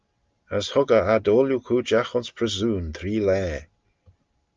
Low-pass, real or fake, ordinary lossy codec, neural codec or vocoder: 7.2 kHz; real; Opus, 24 kbps; none